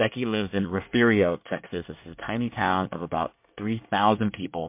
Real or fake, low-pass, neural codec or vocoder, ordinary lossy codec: fake; 3.6 kHz; codec, 24 kHz, 1 kbps, SNAC; MP3, 32 kbps